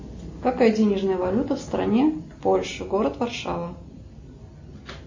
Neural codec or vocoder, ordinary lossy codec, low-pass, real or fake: none; MP3, 32 kbps; 7.2 kHz; real